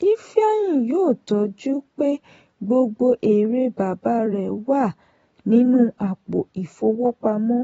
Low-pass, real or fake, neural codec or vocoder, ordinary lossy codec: 19.8 kHz; fake; vocoder, 44.1 kHz, 128 mel bands every 256 samples, BigVGAN v2; AAC, 24 kbps